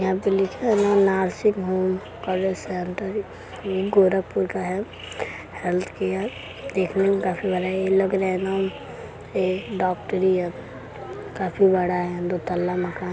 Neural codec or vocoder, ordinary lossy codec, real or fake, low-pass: none; none; real; none